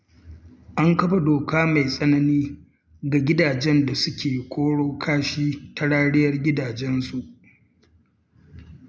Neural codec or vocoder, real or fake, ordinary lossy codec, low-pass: none; real; none; none